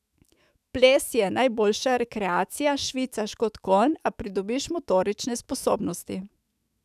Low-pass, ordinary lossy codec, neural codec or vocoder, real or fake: 14.4 kHz; none; autoencoder, 48 kHz, 128 numbers a frame, DAC-VAE, trained on Japanese speech; fake